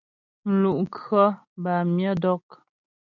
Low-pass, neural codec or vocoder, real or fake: 7.2 kHz; none; real